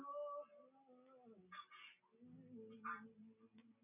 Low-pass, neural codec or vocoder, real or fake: 3.6 kHz; none; real